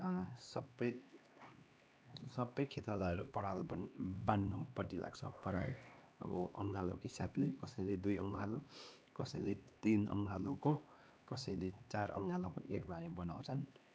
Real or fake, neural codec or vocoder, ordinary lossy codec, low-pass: fake; codec, 16 kHz, 2 kbps, X-Codec, HuBERT features, trained on LibriSpeech; none; none